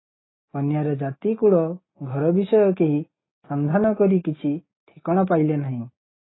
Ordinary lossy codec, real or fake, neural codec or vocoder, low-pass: AAC, 16 kbps; real; none; 7.2 kHz